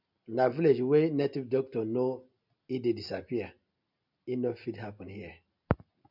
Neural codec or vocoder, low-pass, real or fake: none; 5.4 kHz; real